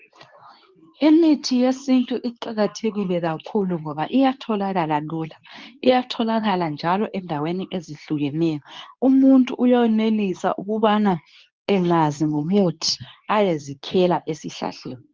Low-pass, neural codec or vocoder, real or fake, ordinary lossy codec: 7.2 kHz; codec, 24 kHz, 0.9 kbps, WavTokenizer, medium speech release version 2; fake; Opus, 24 kbps